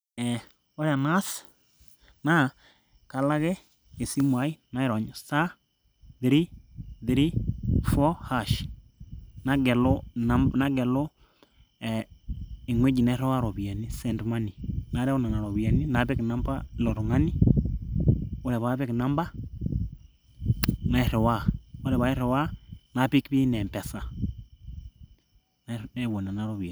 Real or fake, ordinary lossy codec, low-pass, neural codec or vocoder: real; none; none; none